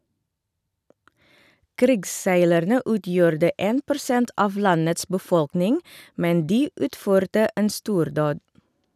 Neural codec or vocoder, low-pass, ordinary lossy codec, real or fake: none; 14.4 kHz; none; real